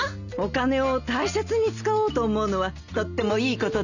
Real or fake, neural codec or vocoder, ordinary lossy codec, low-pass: real; none; none; 7.2 kHz